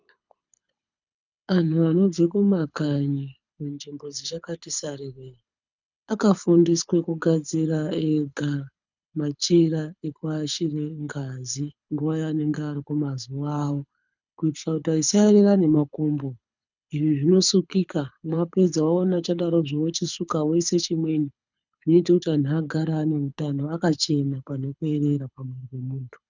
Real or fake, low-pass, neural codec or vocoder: fake; 7.2 kHz; codec, 24 kHz, 6 kbps, HILCodec